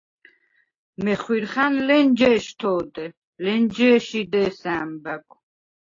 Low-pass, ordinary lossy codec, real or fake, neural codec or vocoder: 7.2 kHz; AAC, 32 kbps; real; none